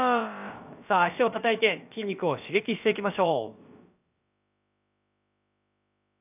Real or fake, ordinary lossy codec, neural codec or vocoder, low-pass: fake; none; codec, 16 kHz, about 1 kbps, DyCAST, with the encoder's durations; 3.6 kHz